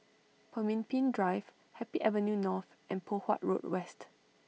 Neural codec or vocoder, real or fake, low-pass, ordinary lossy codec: none; real; none; none